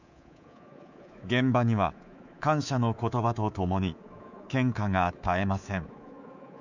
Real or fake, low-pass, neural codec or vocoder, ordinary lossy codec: fake; 7.2 kHz; codec, 24 kHz, 3.1 kbps, DualCodec; none